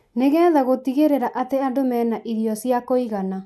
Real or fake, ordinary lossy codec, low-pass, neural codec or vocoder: real; none; none; none